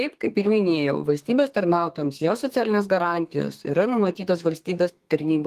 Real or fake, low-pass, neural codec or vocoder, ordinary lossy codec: fake; 14.4 kHz; codec, 32 kHz, 1.9 kbps, SNAC; Opus, 24 kbps